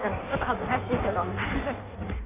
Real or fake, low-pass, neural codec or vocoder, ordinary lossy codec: fake; 3.6 kHz; codec, 16 kHz in and 24 kHz out, 1.1 kbps, FireRedTTS-2 codec; none